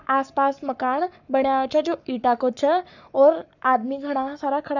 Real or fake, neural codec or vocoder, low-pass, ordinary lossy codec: fake; codec, 44.1 kHz, 7.8 kbps, Pupu-Codec; 7.2 kHz; none